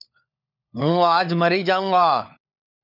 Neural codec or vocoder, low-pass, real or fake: codec, 16 kHz, 4 kbps, FunCodec, trained on LibriTTS, 50 frames a second; 5.4 kHz; fake